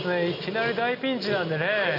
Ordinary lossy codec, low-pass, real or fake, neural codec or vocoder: none; 5.4 kHz; real; none